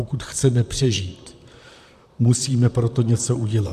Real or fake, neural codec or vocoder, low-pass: fake; vocoder, 44.1 kHz, 128 mel bands, Pupu-Vocoder; 14.4 kHz